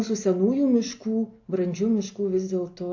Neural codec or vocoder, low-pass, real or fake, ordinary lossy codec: none; 7.2 kHz; real; AAC, 48 kbps